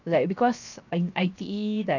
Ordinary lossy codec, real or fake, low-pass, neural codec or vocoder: none; fake; 7.2 kHz; codec, 16 kHz, 0.7 kbps, FocalCodec